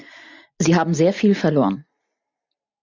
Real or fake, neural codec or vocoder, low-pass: real; none; 7.2 kHz